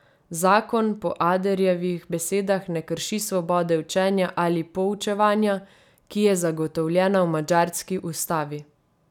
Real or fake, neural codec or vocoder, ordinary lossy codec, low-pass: real; none; none; 19.8 kHz